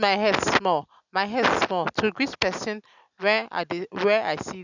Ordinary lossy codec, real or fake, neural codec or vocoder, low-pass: none; real; none; 7.2 kHz